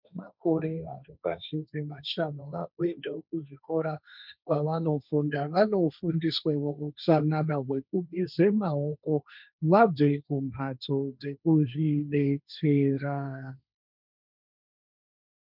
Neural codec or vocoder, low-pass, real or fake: codec, 16 kHz, 1.1 kbps, Voila-Tokenizer; 5.4 kHz; fake